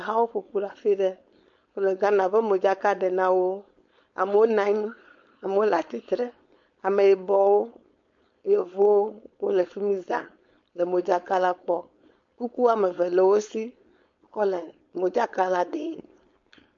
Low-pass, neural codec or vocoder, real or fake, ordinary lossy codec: 7.2 kHz; codec, 16 kHz, 4.8 kbps, FACodec; fake; MP3, 48 kbps